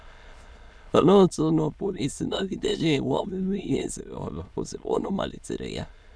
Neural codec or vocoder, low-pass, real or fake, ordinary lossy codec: autoencoder, 22.05 kHz, a latent of 192 numbers a frame, VITS, trained on many speakers; none; fake; none